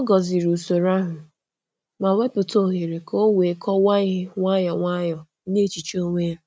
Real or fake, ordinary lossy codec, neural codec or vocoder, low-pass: real; none; none; none